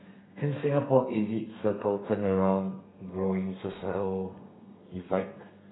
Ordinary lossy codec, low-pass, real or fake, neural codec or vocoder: AAC, 16 kbps; 7.2 kHz; fake; codec, 32 kHz, 1.9 kbps, SNAC